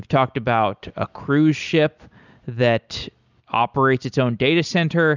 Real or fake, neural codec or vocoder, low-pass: real; none; 7.2 kHz